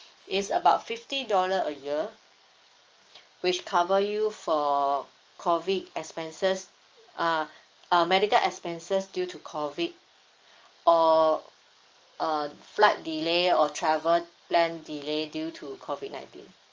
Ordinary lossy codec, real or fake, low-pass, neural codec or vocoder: Opus, 24 kbps; fake; 7.2 kHz; codec, 44.1 kHz, 7.8 kbps, DAC